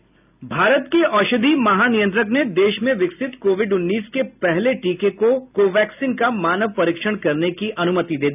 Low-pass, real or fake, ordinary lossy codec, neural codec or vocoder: 3.6 kHz; real; none; none